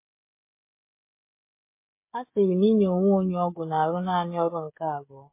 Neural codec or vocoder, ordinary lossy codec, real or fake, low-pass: codec, 16 kHz, 16 kbps, FreqCodec, smaller model; MP3, 24 kbps; fake; 3.6 kHz